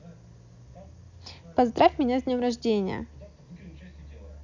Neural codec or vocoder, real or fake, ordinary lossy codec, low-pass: none; real; none; 7.2 kHz